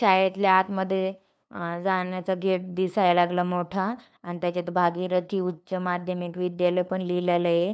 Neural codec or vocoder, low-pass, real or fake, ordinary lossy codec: codec, 16 kHz, 2 kbps, FunCodec, trained on LibriTTS, 25 frames a second; none; fake; none